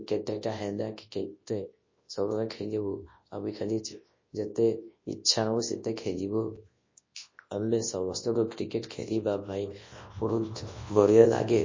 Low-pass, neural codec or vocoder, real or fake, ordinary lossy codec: 7.2 kHz; codec, 24 kHz, 0.9 kbps, WavTokenizer, large speech release; fake; MP3, 32 kbps